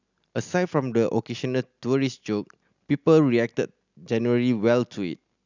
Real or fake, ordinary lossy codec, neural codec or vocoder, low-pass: real; none; none; 7.2 kHz